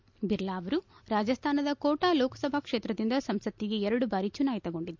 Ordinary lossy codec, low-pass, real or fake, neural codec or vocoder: MP3, 64 kbps; 7.2 kHz; real; none